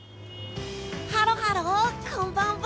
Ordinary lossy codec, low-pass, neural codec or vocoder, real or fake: none; none; none; real